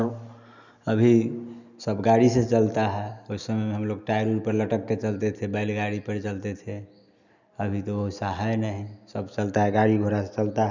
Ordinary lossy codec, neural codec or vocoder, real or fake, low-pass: none; none; real; 7.2 kHz